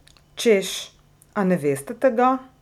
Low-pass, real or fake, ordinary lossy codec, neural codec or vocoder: 19.8 kHz; real; none; none